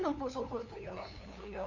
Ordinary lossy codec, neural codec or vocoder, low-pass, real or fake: none; codec, 16 kHz, 2 kbps, FunCodec, trained on LibriTTS, 25 frames a second; 7.2 kHz; fake